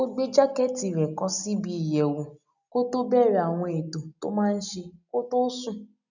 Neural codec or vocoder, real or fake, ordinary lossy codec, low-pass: none; real; none; 7.2 kHz